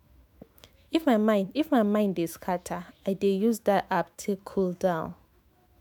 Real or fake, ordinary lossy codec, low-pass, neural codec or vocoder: fake; MP3, 96 kbps; 19.8 kHz; autoencoder, 48 kHz, 128 numbers a frame, DAC-VAE, trained on Japanese speech